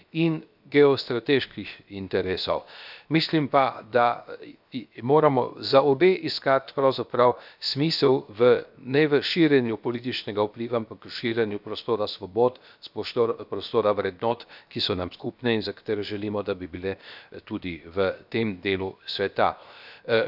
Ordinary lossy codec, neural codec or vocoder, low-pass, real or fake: none; codec, 16 kHz, 0.7 kbps, FocalCodec; 5.4 kHz; fake